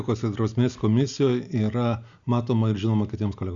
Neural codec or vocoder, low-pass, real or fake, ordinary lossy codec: none; 7.2 kHz; real; Opus, 64 kbps